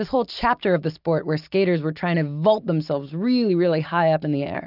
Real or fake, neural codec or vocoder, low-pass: real; none; 5.4 kHz